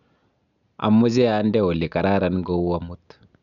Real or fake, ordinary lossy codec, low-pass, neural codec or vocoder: real; none; 7.2 kHz; none